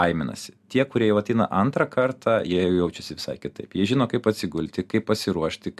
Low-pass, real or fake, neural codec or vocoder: 14.4 kHz; real; none